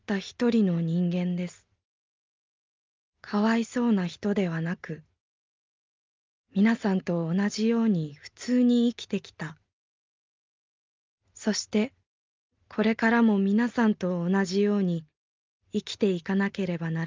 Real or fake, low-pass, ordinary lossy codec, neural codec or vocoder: real; 7.2 kHz; Opus, 32 kbps; none